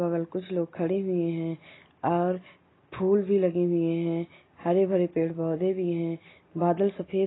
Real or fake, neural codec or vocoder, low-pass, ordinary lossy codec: real; none; 7.2 kHz; AAC, 16 kbps